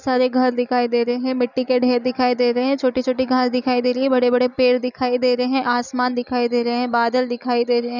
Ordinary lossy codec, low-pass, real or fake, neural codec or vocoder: none; 7.2 kHz; real; none